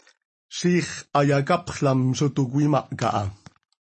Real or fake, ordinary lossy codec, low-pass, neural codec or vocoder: real; MP3, 32 kbps; 10.8 kHz; none